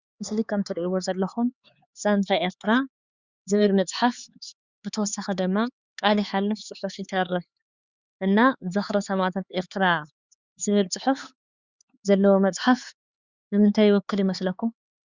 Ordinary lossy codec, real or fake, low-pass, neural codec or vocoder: Opus, 64 kbps; fake; 7.2 kHz; codec, 16 kHz, 4 kbps, X-Codec, HuBERT features, trained on LibriSpeech